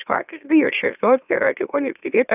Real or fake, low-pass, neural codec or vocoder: fake; 3.6 kHz; autoencoder, 44.1 kHz, a latent of 192 numbers a frame, MeloTTS